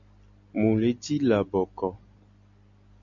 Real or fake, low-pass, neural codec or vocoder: real; 7.2 kHz; none